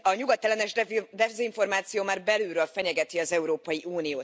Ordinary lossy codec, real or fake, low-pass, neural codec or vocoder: none; real; none; none